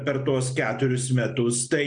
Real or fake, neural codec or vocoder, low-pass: real; none; 9.9 kHz